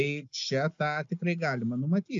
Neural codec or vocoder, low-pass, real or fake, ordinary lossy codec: none; 7.2 kHz; real; AAC, 64 kbps